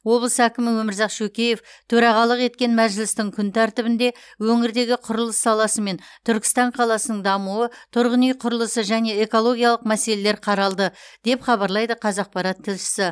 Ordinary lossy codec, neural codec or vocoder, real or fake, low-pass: none; none; real; none